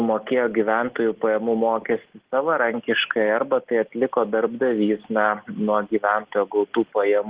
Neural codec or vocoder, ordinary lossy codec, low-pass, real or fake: none; Opus, 16 kbps; 3.6 kHz; real